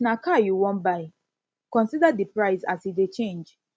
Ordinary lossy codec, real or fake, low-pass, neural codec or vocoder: none; real; none; none